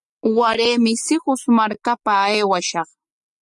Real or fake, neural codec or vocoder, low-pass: real; none; 10.8 kHz